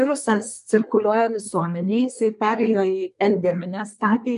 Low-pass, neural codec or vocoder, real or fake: 10.8 kHz; codec, 24 kHz, 1 kbps, SNAC; fake